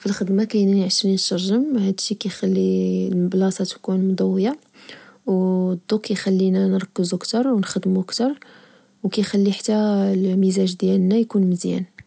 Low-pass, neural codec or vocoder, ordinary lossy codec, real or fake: none; none; none; real